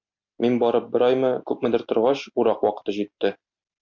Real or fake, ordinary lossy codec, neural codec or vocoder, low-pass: real; MP3, 64 kbps; none; 7.2 kHz